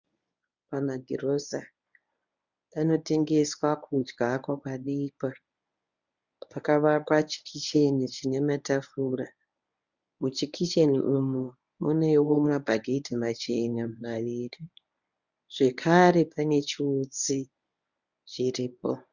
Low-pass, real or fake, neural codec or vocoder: 7.2 kHz; fake; codec, 24 kHz, 0.9 kbps, WavTokenizer, medium speech release version 1